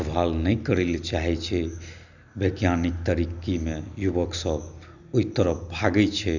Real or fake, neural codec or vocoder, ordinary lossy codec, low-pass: real; none; none; 7.2 kHz